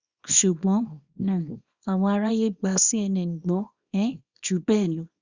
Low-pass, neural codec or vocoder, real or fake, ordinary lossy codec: 7.2 kHz; codec, 24 kHz, 0.9 kbps, WavTokenizer, small release; fake; Opus, 64 kbps